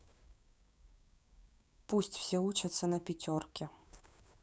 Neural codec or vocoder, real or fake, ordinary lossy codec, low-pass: codec, 16 kHz, 6 kbps, DAC; fake; none; none